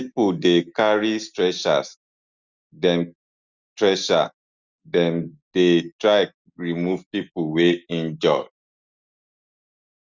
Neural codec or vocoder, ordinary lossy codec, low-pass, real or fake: none; Opus, 64 kbps; 7.2 kHz; real